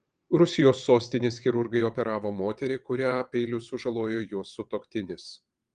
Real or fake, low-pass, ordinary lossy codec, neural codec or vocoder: fake; 9.9 kHz; Opus, 24 kbps; vocoder, 22.05 kHz, 80 mel bands, WaveNeXt